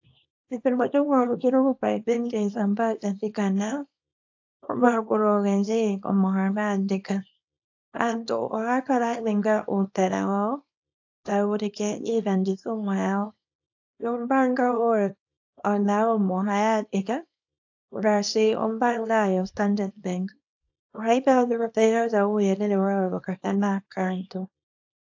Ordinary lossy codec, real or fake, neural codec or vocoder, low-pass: AAC, 48 kbps; fake; codec, 24 kHz, 0.9 kbps, WavTokenizer, small release; 7.2 kHz